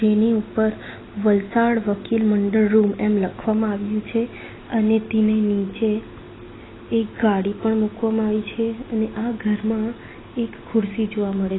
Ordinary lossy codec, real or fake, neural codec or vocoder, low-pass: AAC, 16 kbps; real; none; 7.2 kHz